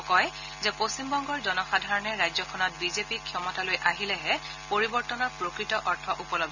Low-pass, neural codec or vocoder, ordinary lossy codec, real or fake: 7.2 kHz; none; none; real